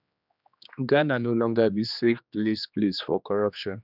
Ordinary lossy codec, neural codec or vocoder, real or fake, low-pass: none; codec, 16 kHz, 2 kbps, X-Codec, HuBERT features, trained on general audio; fake; 5.4 kHz